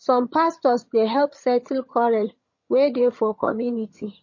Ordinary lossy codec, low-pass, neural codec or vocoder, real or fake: MP3, 32 kbps; 7.2 kHz; vocoder, 22.05 kHz, 80 mel bands, HiFi-GAN; fake